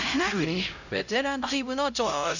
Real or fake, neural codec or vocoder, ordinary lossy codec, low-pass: fake; codec, 16 kHz, 0.5 kbps, X-Codec, HuBERT features, trained on LibriSpeech; none; 7.2 kHz